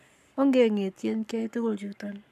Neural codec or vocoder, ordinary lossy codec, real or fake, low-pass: codec, 44.1 kHz, 7.8 kbps, Pupu-Codec; none; fake; 14.4 kHz